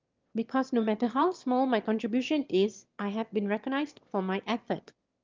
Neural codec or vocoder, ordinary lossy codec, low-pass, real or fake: autoencoder, 22.05 kHz, a latent of 192 numbers a frame, VITS, trained on one speaker; Opus, 32 kbps; 7.2 kHz; fake